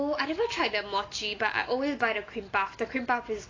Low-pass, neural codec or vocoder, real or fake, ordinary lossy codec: 7.2 kHz; none; real; AAC, 32 kbps